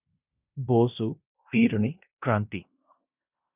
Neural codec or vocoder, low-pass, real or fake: codec, 16 kHz in and 24 kHz out, 0.9 kbps, LongCat-Audio-Codec, fine tuned four codebook decoder; 3.6 kHz; fake